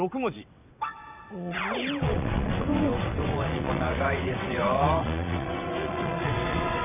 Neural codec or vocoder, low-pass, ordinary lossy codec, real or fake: vocoder, 22.05 kHz, 80 mel bands, WaveNeXt; 3.6 kHz; none; fake